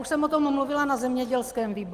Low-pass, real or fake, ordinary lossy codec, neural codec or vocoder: 14.4 kHz; real; Opus, 32 kbps; none